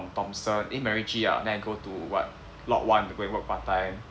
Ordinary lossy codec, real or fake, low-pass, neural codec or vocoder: none; real; none; none